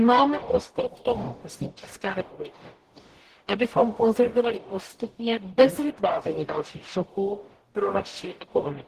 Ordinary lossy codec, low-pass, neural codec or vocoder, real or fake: Opus, 16 kbps; 14.4 kHz; codec, 44.1 kHz, 0.9 kbps, DAC; fake